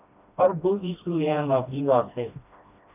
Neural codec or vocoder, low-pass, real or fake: codec, 16 kHz, 1 kbps, FreqCodec, smaller model; 3.6 kHz; fake